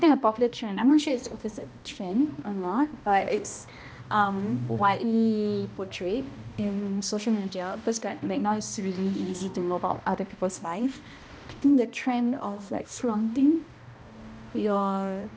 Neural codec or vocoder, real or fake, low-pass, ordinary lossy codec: codec, 16 kHz, 1 kbps, X-Codec, HuBERT features, trained on balanced general audio; fake; none; none